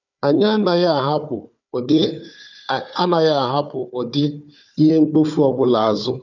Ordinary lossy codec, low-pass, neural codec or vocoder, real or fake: none; 7.2 kHz; codec, 16 kHz, 4 kbps, FunCodec, trained on Chinese and English, 50 frames a second; fake